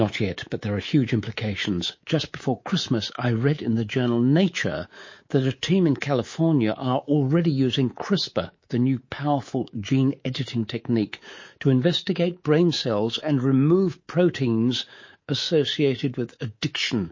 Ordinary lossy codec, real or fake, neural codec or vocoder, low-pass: MP3, 32 kbps; fake; codec, 24 kHz, 3.1 kbps, DualCodec; 7.2 kHz